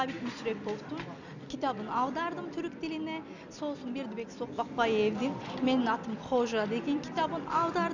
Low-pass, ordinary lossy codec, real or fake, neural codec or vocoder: 7.2 kHz; none; real; none